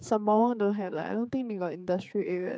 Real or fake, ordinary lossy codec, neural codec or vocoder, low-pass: fake; none; codec, 16 kHz, 4 kbps, X-Codec, HuBERT features, trained on general audio; none